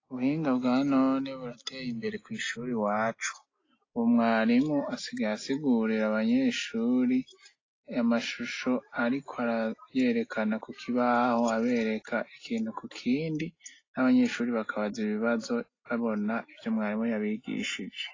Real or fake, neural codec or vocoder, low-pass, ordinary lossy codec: real; none; 7.2 kHz; AAC, 32 kbps